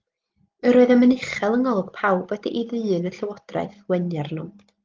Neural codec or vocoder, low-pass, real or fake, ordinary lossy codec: none; 7.2 kHz; real; Opus, 32 kbps